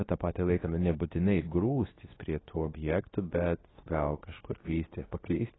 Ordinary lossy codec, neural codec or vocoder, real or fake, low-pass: AAC, 16 kbps; codec, 24 kHz, 0.9 kbps, WavTokenizer, medium speech release version 2; fake; 7.2 kHz